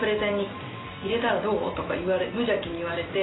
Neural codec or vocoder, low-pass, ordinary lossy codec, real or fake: none; 7.2 kHz; AAC, 16 kbps; real